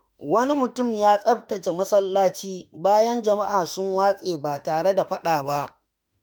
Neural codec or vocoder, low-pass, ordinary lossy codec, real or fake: autoencoder, 48 kHz, 32 numbers a frame, DAC-VAE, trained on Japanese speech; none; none; fake